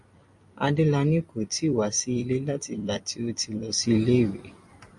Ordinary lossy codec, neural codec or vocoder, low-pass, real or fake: MP3, 48 kbps; vocoder, 44.1 kHz, 128 mel bands every 256 samples, BigVGAN v2; 10.8 kHz; fake